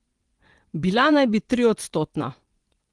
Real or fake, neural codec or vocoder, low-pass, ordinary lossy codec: fake; vocoder, 24 kHz, 100 mel bands, Vocos; 10.8 kHz; Opus, 24 kbps